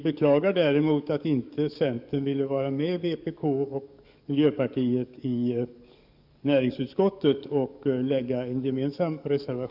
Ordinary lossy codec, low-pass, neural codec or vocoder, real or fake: none; 5.4 kHz; codec, 44.1 kHz, 7.8 kbps, DAC; fake